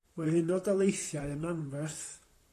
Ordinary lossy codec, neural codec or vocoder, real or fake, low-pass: AAC, 48 kbps; vocoder, 44.1 kHz, 128 mel bands, Pupu-Vocoder; fake; 14.4 kHz